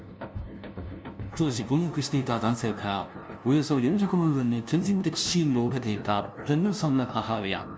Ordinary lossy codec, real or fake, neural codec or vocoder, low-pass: none; fake; codec, 16 kHz, 0.5 kbps, FunCodec, trained on LibriTTS, 25 frames a second; none